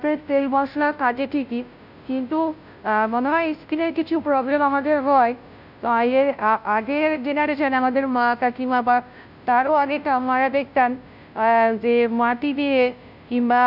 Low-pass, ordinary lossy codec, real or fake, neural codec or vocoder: 5.4 kHz; none; fake; codec, 16 kHz, 0.5 kbps, FunCodec, trained on Chinese and English, 25 frames a second